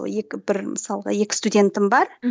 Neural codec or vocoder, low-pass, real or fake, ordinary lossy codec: none; none; real; none